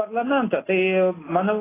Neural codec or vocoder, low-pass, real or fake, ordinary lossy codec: none; 3.6 kHz; real; AAC, 16 kbps